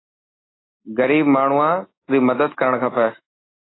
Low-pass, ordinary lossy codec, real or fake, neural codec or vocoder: 7.2 kHz; AAC, 16 kbps; real; none